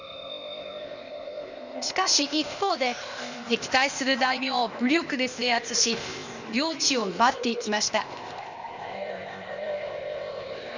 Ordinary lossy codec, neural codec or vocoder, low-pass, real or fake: none; codec, 16 kHz, 0.8 kbps, ZipCodec; 7.2 kHz; fake